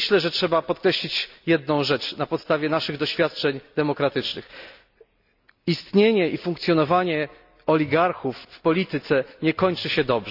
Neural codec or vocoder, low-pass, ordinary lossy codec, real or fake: none; 5.4 kHz; AAC, 48 kbps; real